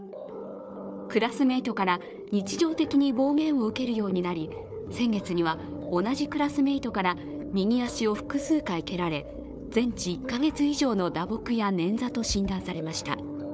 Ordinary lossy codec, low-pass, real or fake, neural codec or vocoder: none; none; fake; codec, 16 kHz, 4 kbps, FunCodec, trained on Chinese and English, 50 frames a second